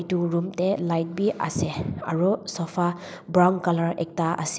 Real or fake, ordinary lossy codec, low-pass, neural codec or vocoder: real; none; none; none